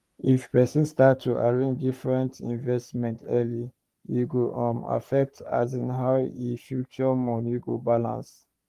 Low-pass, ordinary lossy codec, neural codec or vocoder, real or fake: 14.4 kHz; Opus, 32 kbps; codec, 44.1 kHz, 3.4 kbps, Pupu-Codec; fake